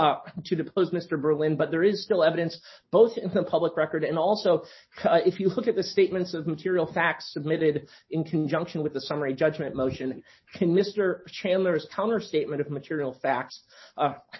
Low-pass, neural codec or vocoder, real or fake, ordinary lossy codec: 7.2 kHz; none; real; MP3, 24 kbps